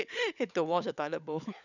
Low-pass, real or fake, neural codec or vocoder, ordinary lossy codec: 7.2 kHz; fake; codec, 16 kHz, 16 kbps, FunCodec, trained on LibriTTS, 50 frames a second; none